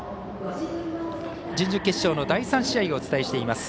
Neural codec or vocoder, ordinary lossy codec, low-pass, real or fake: none; none; none; real